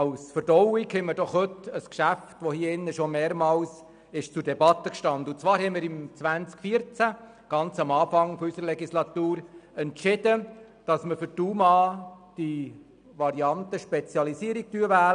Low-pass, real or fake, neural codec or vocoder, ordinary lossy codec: 9.9 kHz; real; none; none